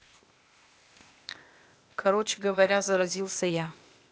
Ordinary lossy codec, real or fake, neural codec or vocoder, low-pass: none; fake; codec, 16 kHz, 0.8 kbps, ZipCodec; none